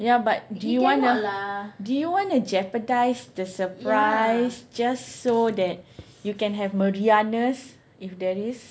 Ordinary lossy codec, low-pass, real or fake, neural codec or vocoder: none; none; real; none